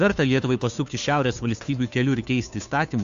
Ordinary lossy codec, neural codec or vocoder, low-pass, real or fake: AAC, 96 kbps; codec, 16 kHz, 2 kbps, FunCodec, trained on Chinese and English, 25 frames a second; 7.2 kHz; fake